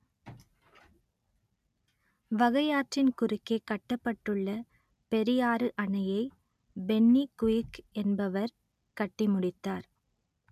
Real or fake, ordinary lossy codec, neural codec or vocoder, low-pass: real; none; none; 14.4 kHz